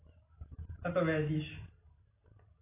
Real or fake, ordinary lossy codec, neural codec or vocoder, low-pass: real; AAC, 24 kbps; none; 3.6 kHz